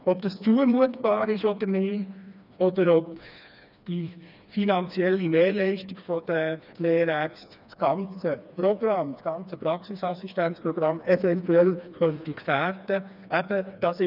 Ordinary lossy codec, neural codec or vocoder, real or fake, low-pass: none; codec, 16 kHz, 2 kbps, FreqCodec, smaller model; fake; 5.4 kHz